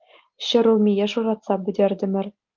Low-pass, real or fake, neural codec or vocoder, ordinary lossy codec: 7.2 kHz; real; none; Opus, 24 kbps